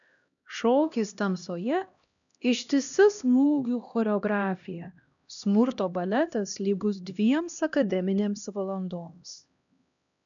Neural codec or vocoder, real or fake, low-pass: codec, 16 kHz, 1 kbps, X-Codec, HuBERT features, trained on LibriSpeech; fake; 7.2 kHz